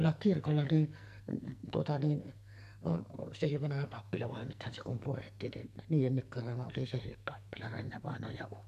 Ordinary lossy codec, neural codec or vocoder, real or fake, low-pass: none; codec, 44.1 kHz, 2.6 kbps, SNAC; fake; 14.4 kHz